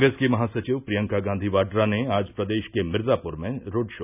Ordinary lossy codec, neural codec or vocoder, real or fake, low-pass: none; none; real; 3.6 kHz